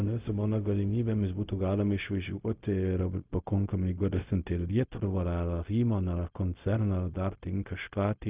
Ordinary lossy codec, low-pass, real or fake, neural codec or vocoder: Opus, 24 kbps; 3.6 kHz; fake; codec, 16 kHz, 0.4 kbps, LongCat-Audio-Codec